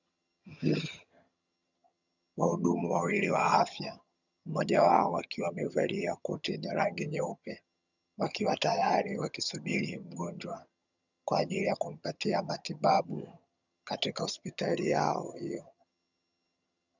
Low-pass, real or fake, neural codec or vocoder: 7.2 kHz; fake; vocoder, 22.05 kHz, 80 mel bands, HiFi-GAN